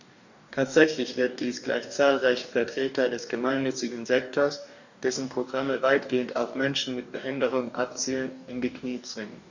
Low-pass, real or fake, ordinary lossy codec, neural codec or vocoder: 7.2 kHz; fake; none; codec, 44.1 kHz, 2.6 kbps, DAC